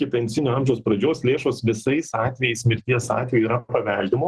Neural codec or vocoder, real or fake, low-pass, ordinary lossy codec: none; real; 10.8 kHz; Opus, 16 kbps